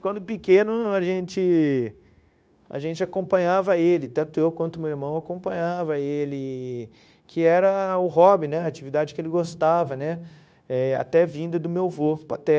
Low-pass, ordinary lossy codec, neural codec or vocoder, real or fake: none; none; codec, 16 kHz, 0.9 kbps, LongCat-Audio-Codec; fake